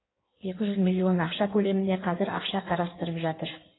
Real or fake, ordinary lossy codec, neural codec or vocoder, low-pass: fake; AAC, 16 kbps; codec, 16 kHz in and 24 kHz out, 1.1 kbps, FireRedTTS-2 codec; 7.2 kHz